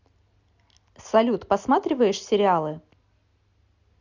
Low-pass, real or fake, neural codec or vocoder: 7.2 kHz; real; none